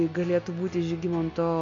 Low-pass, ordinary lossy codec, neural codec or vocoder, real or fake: 7.2 kHz; MP3, 48 kbps; none; real